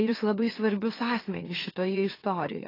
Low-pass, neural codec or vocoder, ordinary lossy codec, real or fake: 5.4 kHz; autoencoder, 44.1 kHz, a latent of 192 numbers a frame, MeloTTS; AAC, 24 kbps; fake